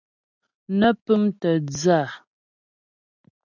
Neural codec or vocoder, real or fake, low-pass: none; real; 7.2 kHz